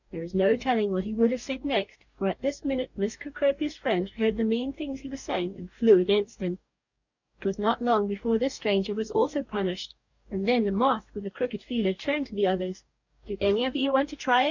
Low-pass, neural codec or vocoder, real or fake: 7.2 kHz; codec, 44.1 kHz, 2.6 kbps, DAC; fake